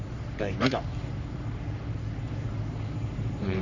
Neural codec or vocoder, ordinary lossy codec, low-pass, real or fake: codec, 44.1 kHz, 3.4 kbps, Pupu-Codec; none; 7.2 kHz; fake